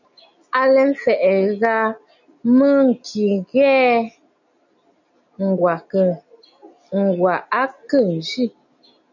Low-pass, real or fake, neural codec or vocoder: 7.2 kHz; real; none